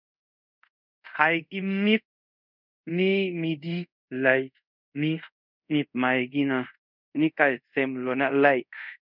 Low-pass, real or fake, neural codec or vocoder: 5.4 kHz; fake; codec, 24 kHz, 0.5 kbps, DualCodec